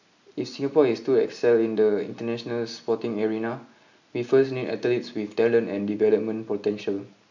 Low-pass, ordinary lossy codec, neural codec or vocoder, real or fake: 7.2 kHz; none; none; real